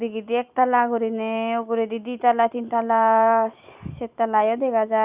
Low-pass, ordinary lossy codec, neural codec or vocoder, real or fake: 3.6 kHz; Opus, 64 kbps; none; real